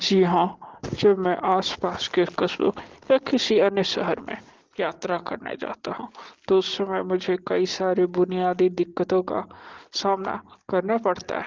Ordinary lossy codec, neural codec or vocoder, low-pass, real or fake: Opus, 16 kbps; none; 7.2 kHz; real